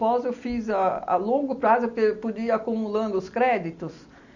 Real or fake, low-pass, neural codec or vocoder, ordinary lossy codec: real; 7.2 kHz; none; none